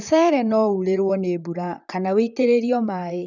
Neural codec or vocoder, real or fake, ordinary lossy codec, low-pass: vocoder, 44.1 kHz, 128 mel bands, Pupu-Vocoder; fake; none; 7.2 kHz